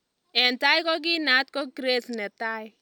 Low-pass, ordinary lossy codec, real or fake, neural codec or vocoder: 19.8 kHz; none; real; none